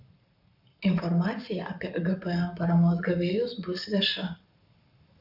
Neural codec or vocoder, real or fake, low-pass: codec, 44.1 kHz, 7.8 kbps, Pupu-Codec; fake; 5.4 kHz